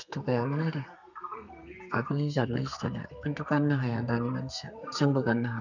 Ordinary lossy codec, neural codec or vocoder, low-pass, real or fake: none; codec, 32 kHz, 1.9 kbps, SNAC; 7.2 kHz; fake